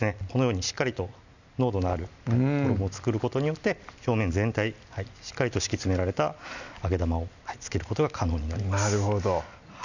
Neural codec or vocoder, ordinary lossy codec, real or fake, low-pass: none; none; real; 7.2 kHz